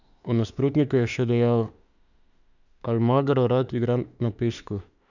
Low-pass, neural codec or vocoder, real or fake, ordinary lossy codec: 7.2 kHz; autoencoder, 48 kHz, 32 numbers a frame, DAC-VAE, trained on Japanese speech; fake; none